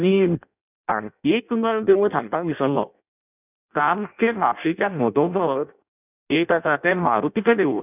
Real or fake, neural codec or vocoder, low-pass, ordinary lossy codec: fake; codec, 16 kHz in and 24 kHz out, 0.6 kbps, FireRedTTS-2 codec; 3.6 kHz; none